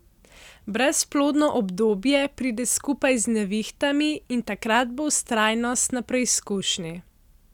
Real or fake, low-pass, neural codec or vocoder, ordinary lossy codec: real; 19.8 kHz; none; none